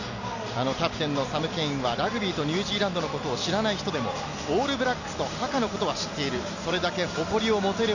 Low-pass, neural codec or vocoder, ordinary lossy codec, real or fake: 7.2 kHz; none; none; real